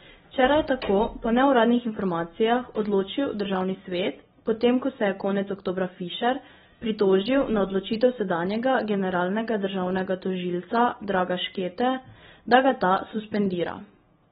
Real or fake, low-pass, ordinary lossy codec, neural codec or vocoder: real; 7.2 kHz; AAC, 16 kbps; none